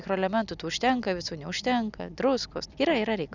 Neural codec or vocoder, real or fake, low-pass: none; real; 7.2 kHz